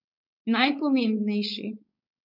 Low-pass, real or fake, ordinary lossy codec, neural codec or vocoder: 5.4 kHz; fake; none; codec, 16 kHz, 4.8 kbps, FACodec